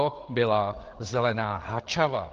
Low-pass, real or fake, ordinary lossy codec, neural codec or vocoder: 7.2 kHz; fake; Opus, 16 kbps; codec, 16 kHz, 8 kbps, FreqCodec, larger model